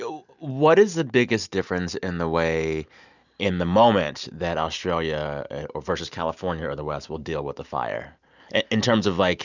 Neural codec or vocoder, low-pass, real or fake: none; 7.2 kHz; real